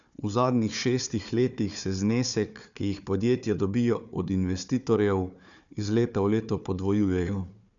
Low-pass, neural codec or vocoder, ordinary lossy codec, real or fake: 7.2 kHz; codec, 16 kHz, 4 kbps, FunCodec, trained on Chinese and English, 50 frames a second; none; fake